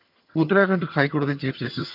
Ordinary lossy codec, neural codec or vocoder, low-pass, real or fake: none; vocoder, 22.05 kHz, 80 mel bands, HiFi-GAN; 5.4 kHz; fake